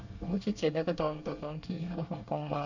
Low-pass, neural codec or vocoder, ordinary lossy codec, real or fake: 7.2 kHz; codec, 24 kHz, 1 kbps, SNAC; none; fake